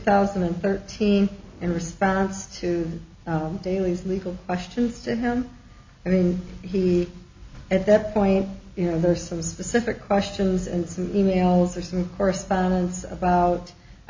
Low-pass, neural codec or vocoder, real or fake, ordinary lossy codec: 7.2 kHz; none; real; MP3, 64 kbps